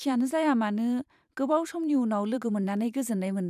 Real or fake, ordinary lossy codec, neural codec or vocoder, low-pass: fake; none; vocoder, 44.1 kHz, 128 mel bands every 512 samples, BigVGAN v2; 14.4 kHz